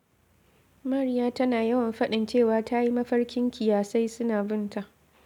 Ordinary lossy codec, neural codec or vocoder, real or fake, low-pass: none; none; real; 19.8 kHz